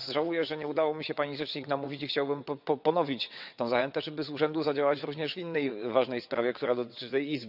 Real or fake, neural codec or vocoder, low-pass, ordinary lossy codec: fake; vocoder, 22.05 kHz, 80 mel bands, WaveNeXt; 5.4 kHz; none